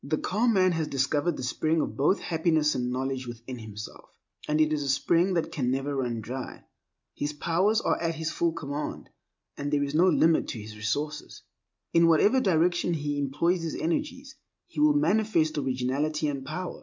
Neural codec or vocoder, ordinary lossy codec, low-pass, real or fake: none; MP3, 64 kbps; 7.2 kHz; real